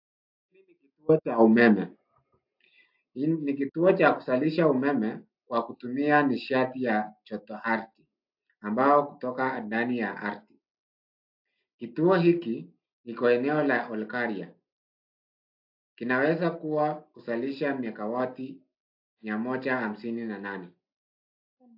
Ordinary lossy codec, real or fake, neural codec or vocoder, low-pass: AAC, 48 kbps; real; none; 5.4 kHz